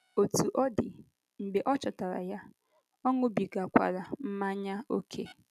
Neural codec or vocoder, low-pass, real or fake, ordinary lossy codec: none; 14.4 kHz; real; none